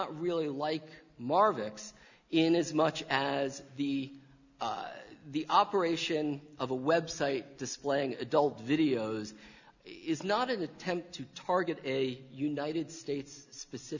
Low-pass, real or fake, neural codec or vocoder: 7.2 kHz; real; none